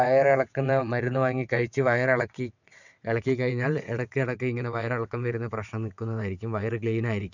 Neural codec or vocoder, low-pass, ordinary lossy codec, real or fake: vocoder, 22.05 kHz, 80 mel bands, WaveNeXt; 7.2 kHz; none; fake